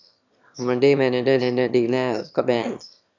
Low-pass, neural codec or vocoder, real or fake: 7.2 kHz; autoencoder, 22.05 kHz, a latent of 192 numbers a frame, VITS, trained on one speaker; fake